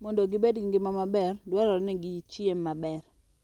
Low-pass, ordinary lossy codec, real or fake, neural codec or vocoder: 19.8 kHz; Opus, 32 kbps; real; none